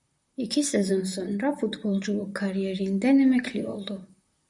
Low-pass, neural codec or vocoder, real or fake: 10.8 kHz; vocoder, 44.1 kHz, 128 mel bands, Pupu-Vocoder; fake